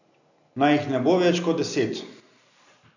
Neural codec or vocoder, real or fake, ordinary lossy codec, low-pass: none; real; none; 7.2 kHz